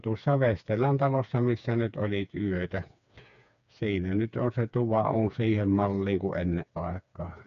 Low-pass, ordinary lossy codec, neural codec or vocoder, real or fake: 7.2 kHz; none; codec, 16 kHz, 4 kbps, FreqCodec, smaller model; fake